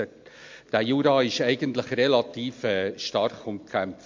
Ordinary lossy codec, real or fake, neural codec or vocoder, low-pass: MP3, 48 kbps; real; none; 7.2 kHz